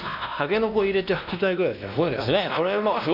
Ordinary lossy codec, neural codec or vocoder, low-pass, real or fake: none; codec, 16 kHz, 1 kbps, X-Codec, WavLM features, trained on Multilingual LibriSpeech; 5.4 kHz; fake